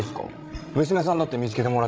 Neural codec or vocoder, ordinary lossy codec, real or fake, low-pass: codec, 16 kHz, 16 kbps, FreqCodec, larger model; none; fake; none